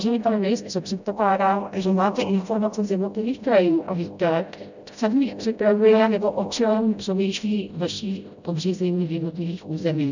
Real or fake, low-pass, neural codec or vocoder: fake; 7.2 kHz; codec, 16 kHz, 0.5 kbps, FreqCodec, smaller model